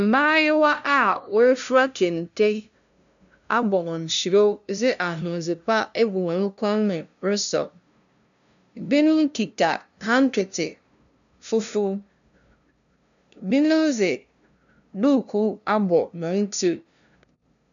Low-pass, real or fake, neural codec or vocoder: 7.2 kHz; fake; codec, 16 kHz, 0.5 kbps, FunCodec, trained on LibriTTS, 25 frames a second